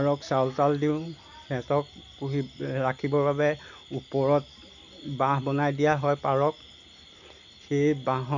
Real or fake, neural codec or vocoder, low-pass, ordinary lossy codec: real; none; 7.2 kHz; none